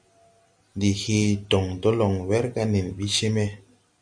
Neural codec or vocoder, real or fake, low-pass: none; real; 9.9 kHz